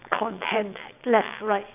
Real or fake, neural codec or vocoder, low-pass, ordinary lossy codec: fake; vocoder, 22.05 kHz, 80 mel bands, WaveNeXt; 3.6 kHz; none